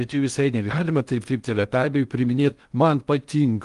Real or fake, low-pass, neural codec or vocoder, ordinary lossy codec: fake; 10.8 kHz; codec, 16 kHz in and 24 kHz out, 0.6 kbps, FocalCodec, streaming, 2048 codes; Opus, 32 kbps